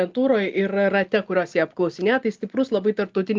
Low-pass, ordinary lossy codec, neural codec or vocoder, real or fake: 7.2 kHz; Opus, 24 kbps; none; real